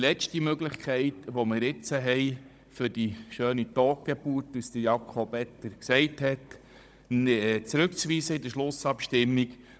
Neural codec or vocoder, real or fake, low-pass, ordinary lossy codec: codec, 16 kHz, 16 kbps, FunCodec, trained on Chinese and English, 50 frames a second; fake; none; none